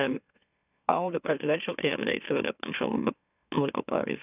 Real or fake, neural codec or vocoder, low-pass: fake; autoencoder, 44.1 kHz, a latent of 192 numbers a frame, MeloTTS; 3.6 kHz